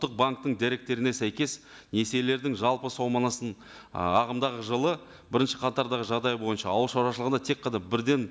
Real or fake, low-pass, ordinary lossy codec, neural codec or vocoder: real; none; none; none